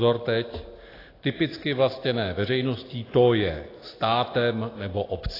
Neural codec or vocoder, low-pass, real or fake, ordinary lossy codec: none; 5.4 kHz; real; AAC, 24 kbps